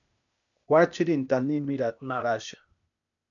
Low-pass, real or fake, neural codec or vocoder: 7.2 kHz; fake; codec, 16 kHz, 0.8 kbps, ZipCodec